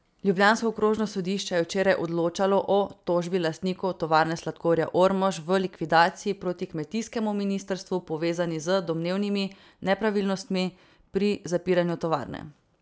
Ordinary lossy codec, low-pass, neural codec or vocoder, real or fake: none; none; none; real